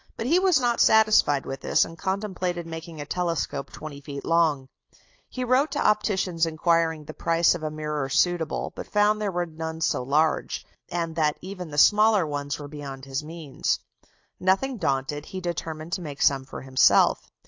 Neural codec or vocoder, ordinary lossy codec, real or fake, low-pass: none; AAC, 48 kbps; real; 7.2 kHz